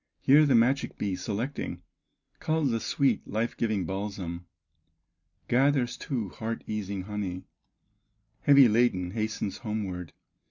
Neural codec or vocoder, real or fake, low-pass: none; real; 7.2 kHz